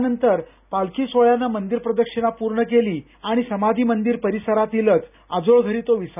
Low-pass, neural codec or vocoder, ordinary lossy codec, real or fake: 3.6 kHz; none; none; real